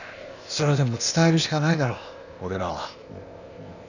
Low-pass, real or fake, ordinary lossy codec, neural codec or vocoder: 7.2 kHz; fake; none; codec, 16 kHz, 0.8 kbps, ZipCodec